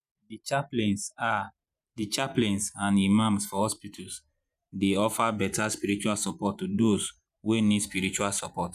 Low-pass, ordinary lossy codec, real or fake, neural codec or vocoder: 14.4 kHz; none; fake; vocoder, 44.1 kHz, 128 mel bands every 512 samples, BigVGAN v2